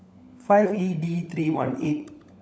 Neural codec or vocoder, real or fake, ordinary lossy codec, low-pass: codec, 16 kHz, 16 kbps, FunCodec, trained on LibriTTS, 50 frames a second; fake; none; none